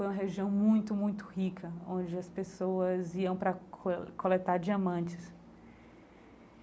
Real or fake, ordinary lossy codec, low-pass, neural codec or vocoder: real; none; none; none